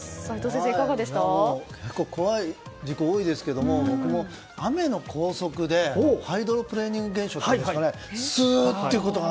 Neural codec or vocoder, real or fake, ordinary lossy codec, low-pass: none; real; none; none